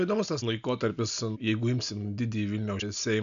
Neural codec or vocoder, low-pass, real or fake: none; 7.2 kHz; real